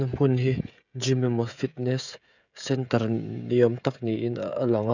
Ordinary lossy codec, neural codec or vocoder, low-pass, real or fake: none; codec, 16 kHz, 16 kbps, FreqCodec, smaller model; 7.2 kHz; fake